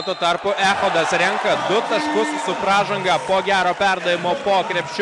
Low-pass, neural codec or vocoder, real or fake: 10.8 kHz; none; real